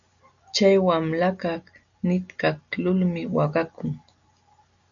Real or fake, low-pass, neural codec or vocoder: real; 7.2 kHz; none